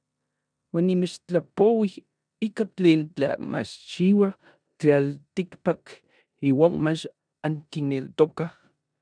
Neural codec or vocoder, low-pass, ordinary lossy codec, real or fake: codec, 16 kHz in and 24 kHz out, 0.9 kbps, LongCat-Audio-Codec, four codebook decoder; 9.9 kHz; MP3, 96 kbps; fake